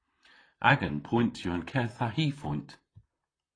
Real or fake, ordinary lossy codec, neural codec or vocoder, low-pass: fake; AAC, 32 kbps; vocoder, 44.1 kHz, 128 mel bands, Pupu-Vocoder; 9.9 kHz